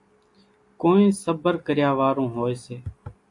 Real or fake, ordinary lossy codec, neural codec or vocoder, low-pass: real; AAC, 64 kbps; none; 10.8 kHz